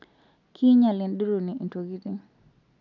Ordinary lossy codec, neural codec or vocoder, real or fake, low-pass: none; none; real; 7.2 kHz